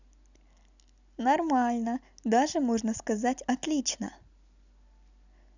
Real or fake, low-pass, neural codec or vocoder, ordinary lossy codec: real; 7.2 kHz; none; none